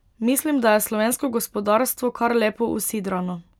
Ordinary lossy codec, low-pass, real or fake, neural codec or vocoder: none; 19.8 kHz; real; none